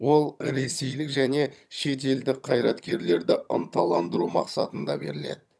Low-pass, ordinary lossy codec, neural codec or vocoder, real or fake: none; none; vocoder, 22.05 kHz, 80 mel bands, HiFi-GAN; fake